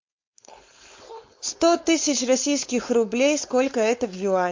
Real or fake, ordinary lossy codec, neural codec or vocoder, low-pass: fake; MP3, 48 kbps; codec, 16 kHz, 4.8 kbps, FACodec; 7.2 kHz